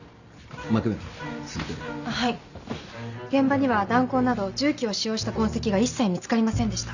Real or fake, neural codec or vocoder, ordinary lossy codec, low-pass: real; none; none; 7.2 kHz